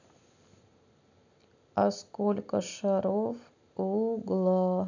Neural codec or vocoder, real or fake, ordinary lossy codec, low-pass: none; real; none; 7.2 kHz